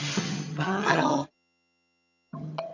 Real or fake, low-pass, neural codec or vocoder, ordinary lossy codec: fake; 7.2 kHz; vocoder, 22.05 kHz, 80 mel bands, HiFi-GAN; none